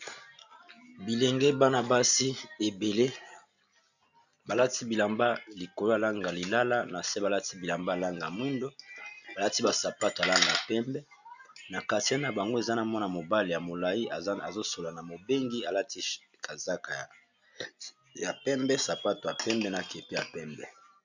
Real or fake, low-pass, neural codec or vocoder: real; 7.2 kHz; none